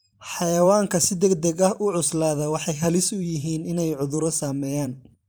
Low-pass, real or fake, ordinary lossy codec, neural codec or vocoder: none; fake; none; vocoder, 44.1 kHz, 128 mel bands every 512 samples, BigVGAN v2